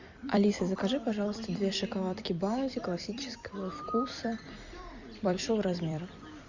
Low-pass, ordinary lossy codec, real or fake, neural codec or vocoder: 7.2 kHz; Opus, 64 kbps; fake; vocoder, 44.1 kHz, 128 mel bands every 256 samples, BigVGAN v2